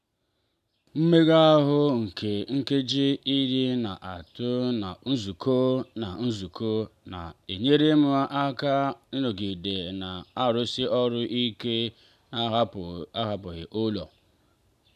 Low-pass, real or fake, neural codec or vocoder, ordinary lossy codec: 14.4 kHz; real; none; none